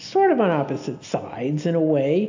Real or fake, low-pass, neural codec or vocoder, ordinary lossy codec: real; 7.2 kHz; none; AAC, 48 kbps